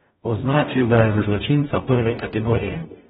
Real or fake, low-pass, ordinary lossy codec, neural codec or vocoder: fake; 19.8 kHz; AAC, 16 kbps; codec, 44.1 kHz, 0.9 kbps, DAC